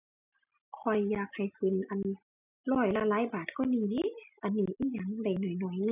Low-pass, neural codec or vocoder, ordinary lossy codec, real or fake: 3.6 kHz; none; none; real